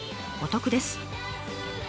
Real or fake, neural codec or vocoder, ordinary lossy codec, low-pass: real; none; none; none